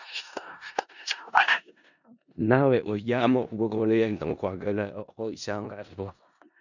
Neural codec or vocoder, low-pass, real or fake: codec, 16 kHz in and 24 kHz out, 0.4 kbps, LongCat-Audio-Codec, four codebook decoder; 7.2 kHz; fake